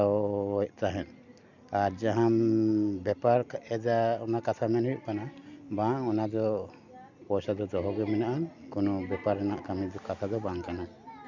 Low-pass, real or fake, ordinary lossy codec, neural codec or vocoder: 7.2 kHz; real; MP3, 64 kbps; none